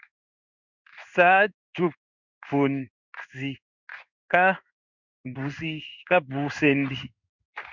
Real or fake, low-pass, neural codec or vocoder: fake; 7.2 kHz; codec, 16 kHz in and 24 kHz out, 1 kbps, XY-Tokenizer